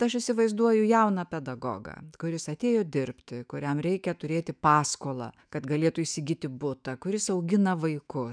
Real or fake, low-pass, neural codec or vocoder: fake; 9.9 kHz; autoencoder, 48 kHz, 128 numbers a frame, DAC-VAE, trained on Japanese speech